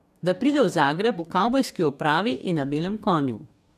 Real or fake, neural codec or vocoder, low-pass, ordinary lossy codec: fake; codec, 44.1 kHz, 2.6 kbps, DAC; 14.4 kHz; none